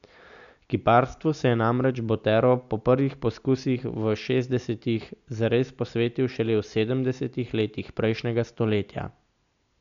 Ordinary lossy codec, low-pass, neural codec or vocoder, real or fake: none; 7.2 kHz; none; real